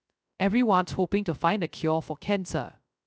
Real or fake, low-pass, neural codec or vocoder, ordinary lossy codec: fake; none; codec, 16 kHz, 0.3 kbps, FocalCodec; none